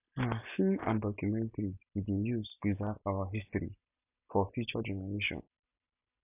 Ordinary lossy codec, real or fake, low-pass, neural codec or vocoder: none; real; 3.6 kHz; none